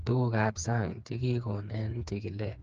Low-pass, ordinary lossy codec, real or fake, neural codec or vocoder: 7.2 kHz; Opus, 24 kbps; fake; codec, 16 kHz, 4 kbps, FreqCodec, smaller model